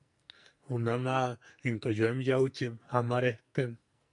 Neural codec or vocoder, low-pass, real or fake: codec, 44.1 kHz, 2.6 kbps, SNAC; 10.8 kHz; fake